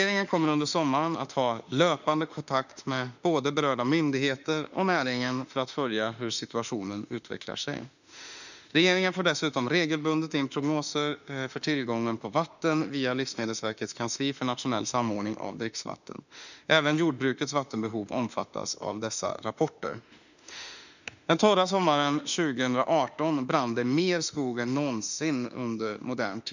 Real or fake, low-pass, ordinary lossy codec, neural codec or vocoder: fake; 7.2 kHz; none; autoencoder, 48 kHz, 32 numbers a frame, DAC-VAE, trained on Japanese speech